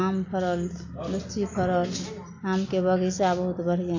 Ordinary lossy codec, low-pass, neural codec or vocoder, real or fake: AAC, 48 kbps; 7.2 kHz; none; real